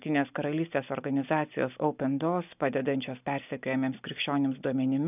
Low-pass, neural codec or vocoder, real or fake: 3.6 kHz; none; real